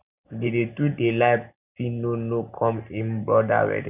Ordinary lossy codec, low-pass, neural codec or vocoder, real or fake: none; 3.6 kHz; none; real